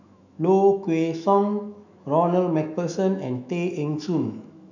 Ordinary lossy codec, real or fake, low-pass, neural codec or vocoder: none; fake; 7.2 kHz; autoencoder, 48 kHz, 128 numbers a frame, DAC-VAE, trained on Japanese speech